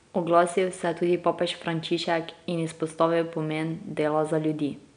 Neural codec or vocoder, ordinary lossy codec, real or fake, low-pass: none; none; real; 9.9 kHz